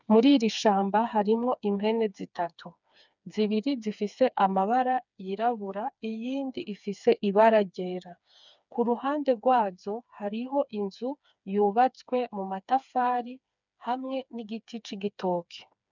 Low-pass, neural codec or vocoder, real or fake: 7.2 kHz; codec, 16 kHz, 4 kbps, FreqCodec, smaller model; fake